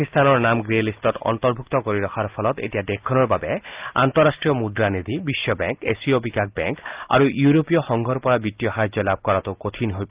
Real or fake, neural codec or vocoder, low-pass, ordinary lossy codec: real; none; 3.6 kHz; Opus, 32 kbps